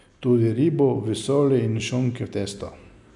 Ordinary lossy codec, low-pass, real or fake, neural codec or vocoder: none; 10.8 kHz; real; none